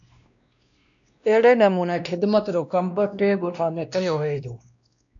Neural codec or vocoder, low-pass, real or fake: codec, 16 kHz, 1 kbps, X-Codec, WavLM features, trained on Multilingual LibriSpeech; 7.2 kHz; fake